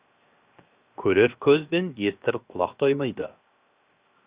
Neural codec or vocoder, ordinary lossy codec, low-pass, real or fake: codec, 16 kHz, 0.7 kbps, FocalCodec; Opus, 64 kbps; 3.6 kHz; fake